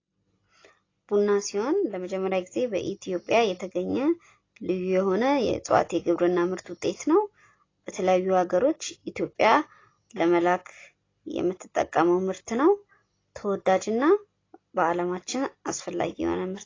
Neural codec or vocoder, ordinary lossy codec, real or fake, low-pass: none; AAC, 32 kbps; real; 7.2 kHz